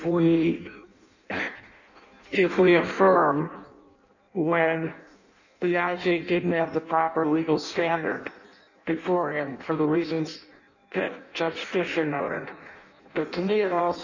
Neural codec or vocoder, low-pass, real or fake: codec, 16 kHz in and 24 kHz out, 0.6 kbps, FireRedTTS-2 codec; 7.2 kHz; fake